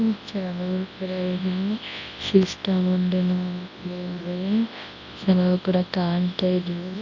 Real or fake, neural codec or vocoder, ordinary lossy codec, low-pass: fake; codec, 24 kHz, 0.9 kbps, WavTokenizer, large speech release; MP3, 48 kbps; 7.2 kHz